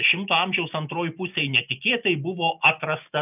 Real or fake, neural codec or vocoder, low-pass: real; none; 3.6 kHz